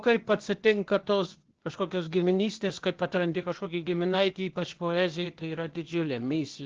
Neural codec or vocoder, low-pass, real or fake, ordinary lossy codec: codec, 16 kHz, 0.8 kbps, ZipCodec; 7.2 kHz; fake; Opus, 16 kbps